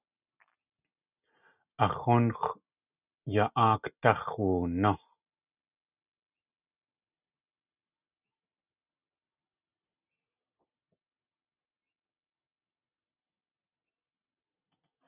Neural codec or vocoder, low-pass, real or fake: none; 3.6 kHz; real